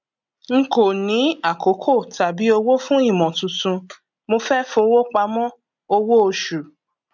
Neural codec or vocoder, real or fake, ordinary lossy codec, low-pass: none; real; none; 7.2 kHz